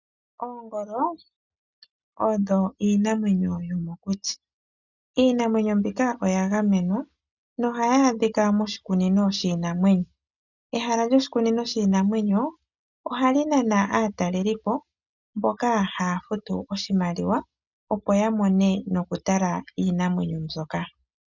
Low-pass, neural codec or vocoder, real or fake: 7.2 kHz; none; real